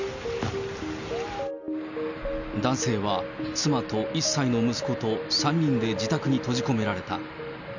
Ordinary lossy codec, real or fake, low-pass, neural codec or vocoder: none; real; 7.2 kHz; none